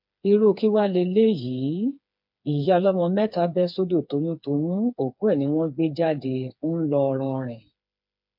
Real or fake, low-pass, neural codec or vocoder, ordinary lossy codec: fake; 5.4 kHz; codec, 16 kHz, 4 kbps, FreqCodec, smaller model; MP3, 48 kbps